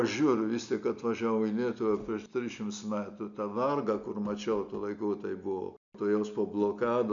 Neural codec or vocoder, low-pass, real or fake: none; 7.2 kHz; real